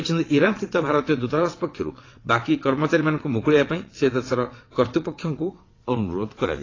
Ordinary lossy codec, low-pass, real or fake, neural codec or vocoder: AAC, 32 kbps; 7.2 kHz; fake; vocoder, 22.05 kHz, 80 mel bands, WaveNeXt